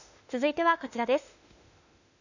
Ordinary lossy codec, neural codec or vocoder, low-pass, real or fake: none; autoencoder, 48 kHz, 32 numbers a frame, DAC-VAE, trained on Japanese speech; 7.2 kHz; fake